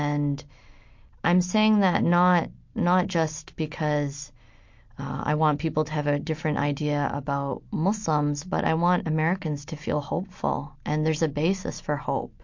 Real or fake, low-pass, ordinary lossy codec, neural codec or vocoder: real; 7.2 kHz; MP3, 48 kbps; none